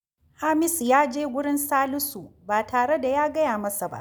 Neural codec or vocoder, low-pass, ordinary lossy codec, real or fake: none; none; none; real